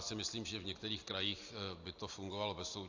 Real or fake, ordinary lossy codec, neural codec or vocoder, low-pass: real; AAC, 48 kbps; none; 7.2 kHz